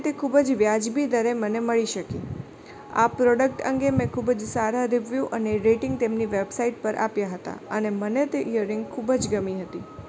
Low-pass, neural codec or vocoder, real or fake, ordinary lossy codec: none; none; real; none